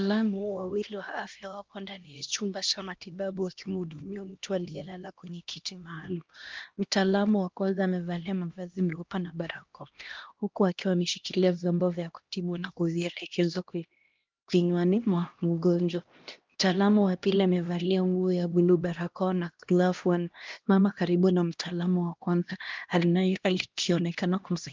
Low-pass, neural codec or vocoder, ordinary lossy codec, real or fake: 7.2 kHz; codec, 16 kHz, 1 kbps, X-Codec, HuBERT features, trained on LibriSpeech; Opus, 32 kbps; fake